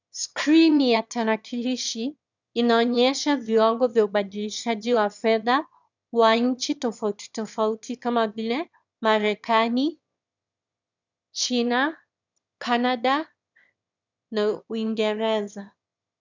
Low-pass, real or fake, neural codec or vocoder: 7.2 kHz; fake; autoencoder, 22.05 kHz, a latent of 192 numbers a frame, VITS, trained on one speaker